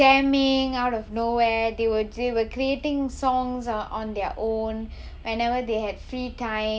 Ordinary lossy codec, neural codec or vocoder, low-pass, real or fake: none; none; none; real